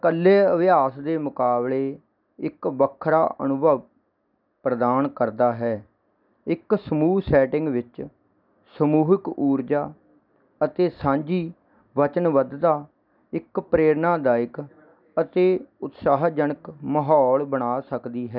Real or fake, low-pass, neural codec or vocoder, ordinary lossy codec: real; 5.4 kHz; none; none